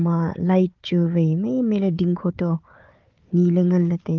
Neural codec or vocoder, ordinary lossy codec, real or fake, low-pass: codec, 16 kHz, 4 kbps, FunCodec, trained on Chinese and English, 50 frames a second; Opus, 32 kbps; fake; 7.2 kHz